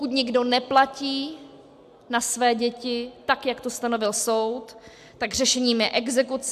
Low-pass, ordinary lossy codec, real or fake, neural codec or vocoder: 14.4 kHz; AAC, 96 kbps; real; none